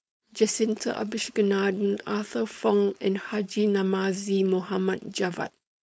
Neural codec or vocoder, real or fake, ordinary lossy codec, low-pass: codec, 16 kHz, 4.8 kbps, FACodec; fake; none; none